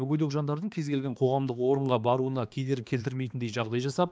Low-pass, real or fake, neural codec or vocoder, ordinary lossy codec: none; fake; codec, 16 kHz, 2 kbps, X-Codec, HuBERT features, trained on balanced general audio; none